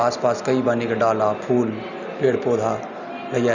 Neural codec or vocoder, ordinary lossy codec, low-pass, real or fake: none; none; 7.2 kHz; real